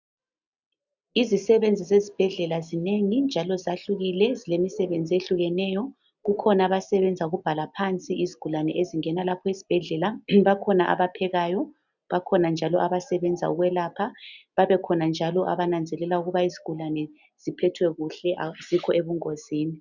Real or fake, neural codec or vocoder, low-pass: real; none; 7.2 kHz